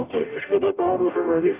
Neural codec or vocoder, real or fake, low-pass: codec, 44.1 kHz, 0.9 kbps, DAC; fake; 3.6 kHz